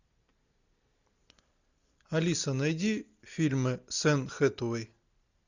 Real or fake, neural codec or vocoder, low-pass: real; none; 7.2 kHz